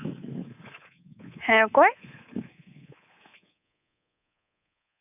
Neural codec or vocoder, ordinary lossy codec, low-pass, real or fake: codec, 24 kHz, 3.1 kbps, DualCodec; none; 3.6 kHz; fake